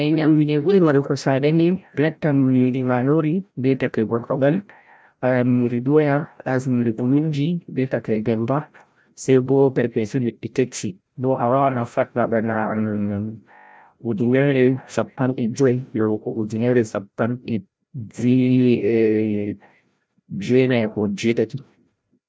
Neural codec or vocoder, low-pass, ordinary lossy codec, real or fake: codec, 16 kHz, 0.5 kbps, FreqCodec, larger model; none; none; fake